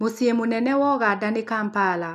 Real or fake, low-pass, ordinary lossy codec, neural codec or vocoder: real; 14.4 kHz; none; none